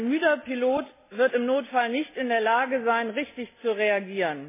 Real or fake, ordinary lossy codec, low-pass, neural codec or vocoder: real; MP3, 16 kbps; 3.6 kHz; none